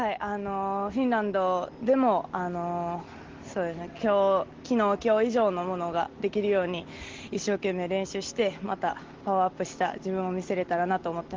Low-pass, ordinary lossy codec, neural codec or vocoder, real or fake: 7.2 kHz; Opus, 16 kbps; none; real